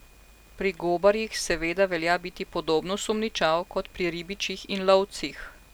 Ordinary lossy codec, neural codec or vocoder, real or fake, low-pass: none; none; real; none